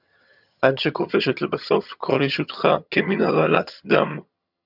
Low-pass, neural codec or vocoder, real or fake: 5.4 kHz; vocoder, 22.05 kHz, 80 mel bands, HiFi-GAN; fake